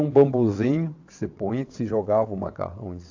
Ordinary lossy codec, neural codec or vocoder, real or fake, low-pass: AAC, 48 kbps; vocoder, 22.05 kHz, 80 mel bands, WaveNeXt; fake; 7.2 kHz